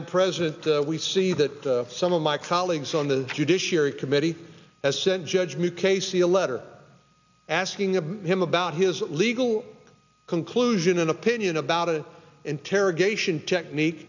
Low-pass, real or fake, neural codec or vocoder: 7.2 kHz; real; none